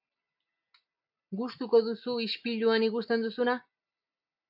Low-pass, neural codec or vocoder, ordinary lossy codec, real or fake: 5.4 kHz; none; AAC, 48 kbps; real